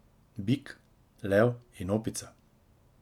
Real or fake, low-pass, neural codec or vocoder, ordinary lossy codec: real; 19.8 kHz; none; none